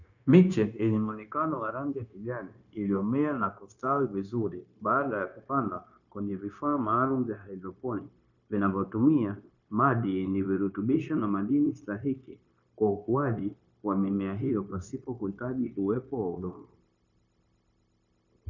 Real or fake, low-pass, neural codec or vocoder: fake; 7.2 kHz; codec, 16 kHz, 0.9 kbps, LongCat-Audio-Codec